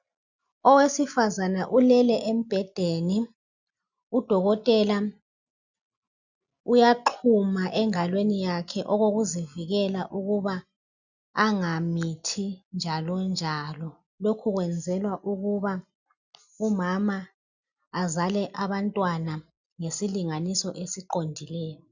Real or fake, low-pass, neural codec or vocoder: real; 7.2 kHz; none